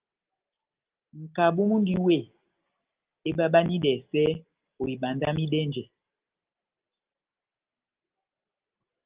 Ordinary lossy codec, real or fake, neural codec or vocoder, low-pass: Opus, 32 kbps; real; none; 3.6 kHz